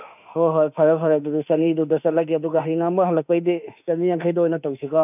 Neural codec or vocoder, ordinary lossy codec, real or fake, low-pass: autoencoder, 48 kHz, 32 numbers a frame, DAC-VAE, trained on Japanese speech; none; fake; 3.6 kHz